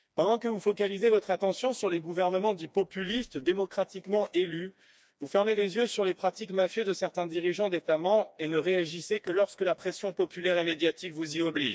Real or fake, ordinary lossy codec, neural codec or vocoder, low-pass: fake; none; codec, 16 kHz, 2 kbps, FreqCodec, smaller model; none